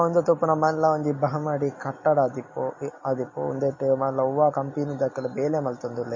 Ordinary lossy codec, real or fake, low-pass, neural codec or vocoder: MP3, 32 kbps; real; 7.2 kHz; none